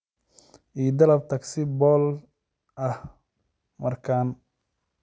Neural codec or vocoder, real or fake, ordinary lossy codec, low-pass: none; real; none; none